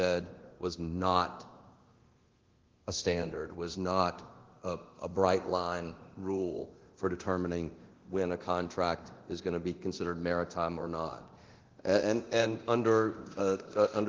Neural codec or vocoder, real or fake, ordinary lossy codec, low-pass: codec, 24 kHz, 0.9 kbps, DualCodec; fake; Opus, 16 kbps; 7.2 kHz